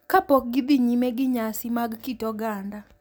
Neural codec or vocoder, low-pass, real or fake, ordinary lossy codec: none; none; real; none